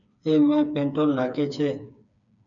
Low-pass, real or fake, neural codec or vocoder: 7.2 kHz; fake; codec, 16 kHz, 4 kbps, FreqCodec, smaller model